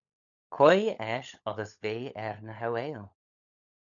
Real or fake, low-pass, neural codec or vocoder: fake; 7.2 kHz; codec, 16 kHz, 16 kbps, FunCodec, trained on LibriTTS, 50 frames a second